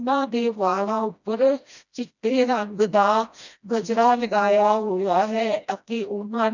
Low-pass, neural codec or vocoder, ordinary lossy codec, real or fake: 7.2 kHz; codec, 16 kHz, 1 kbps, FreqCodec, smaller model; none; fake